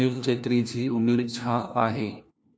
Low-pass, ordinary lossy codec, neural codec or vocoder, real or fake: none; none; codec, 16 kHz, 1 kbps, FunCodec, trained on LibriTTS, 50 frames a second; fake